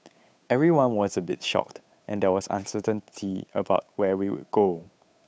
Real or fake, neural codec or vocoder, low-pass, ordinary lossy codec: fake; codec, 16 kHz, 8 kbps, FunCodec, trained on Chinese and English, 25 frames a second; none; none